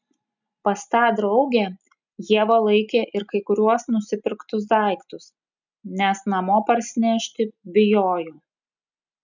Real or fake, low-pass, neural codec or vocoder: real; 7.2 kHz; none